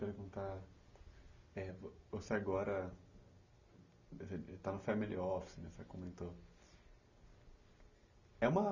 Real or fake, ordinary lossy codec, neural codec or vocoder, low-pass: real; none; none; 7.2 kHz